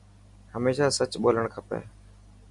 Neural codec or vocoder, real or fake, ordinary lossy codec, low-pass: none; real; MP3, 96 kbps; 10.8 kHz